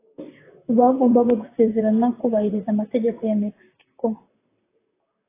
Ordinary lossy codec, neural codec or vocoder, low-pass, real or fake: AAC, 16 kbps; vocoder, 44.1 kHz, 128 mel bands, Pupu-Vocoder; 3.6 kHz; fake